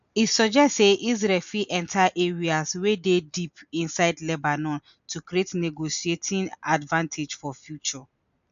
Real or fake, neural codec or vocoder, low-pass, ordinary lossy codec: real; none; 7.2 kHz; none